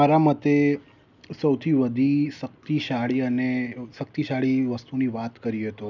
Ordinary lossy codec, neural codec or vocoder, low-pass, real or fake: none; none; 7.2 kHz; real